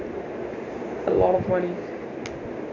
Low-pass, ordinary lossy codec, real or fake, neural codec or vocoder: 7.2 kHz; none; real; none